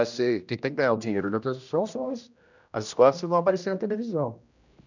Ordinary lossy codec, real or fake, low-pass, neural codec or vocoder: none; fake; 7.2 kHz; codec, 16 kHz, 1 kbps, X-Codec, HuBERT features, trained on general audio